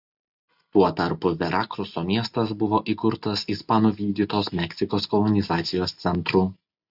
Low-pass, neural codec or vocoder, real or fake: 5.4 kHz; none; real